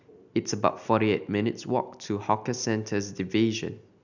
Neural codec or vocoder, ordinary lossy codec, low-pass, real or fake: none; none; 7.2 kHz; real